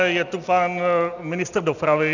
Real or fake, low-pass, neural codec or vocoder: real; 7.2 kHz; none